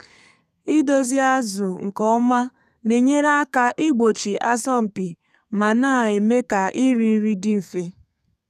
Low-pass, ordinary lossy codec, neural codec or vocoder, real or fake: 14.4 kHz; none; codec, 32 kHz, 1.9 kbps, SNAC; fake